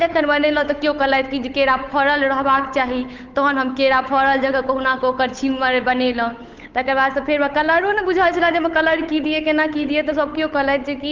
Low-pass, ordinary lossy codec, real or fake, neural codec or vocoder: 7.2 kHz; Opus, 32 kbps; fake; codec, 16 kHz, 8 kbps, FunCodec, trained on Chinese and English, 25 frames a second